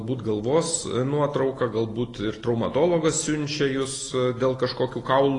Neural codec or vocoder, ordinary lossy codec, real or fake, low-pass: none; AAC, 32 kbps; real; 10.8 kHz